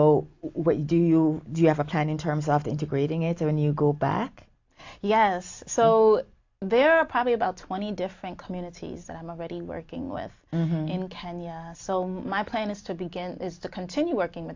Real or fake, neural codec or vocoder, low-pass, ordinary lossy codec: real; none; 7.2 kHz; AAC, 48 kbps